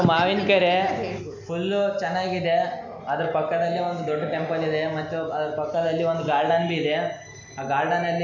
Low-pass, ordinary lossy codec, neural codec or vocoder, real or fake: 7.2 kHz; none; none; real